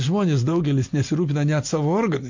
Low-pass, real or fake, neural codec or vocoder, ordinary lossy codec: 7.2 kHz; real; none; MP3, 48 kbps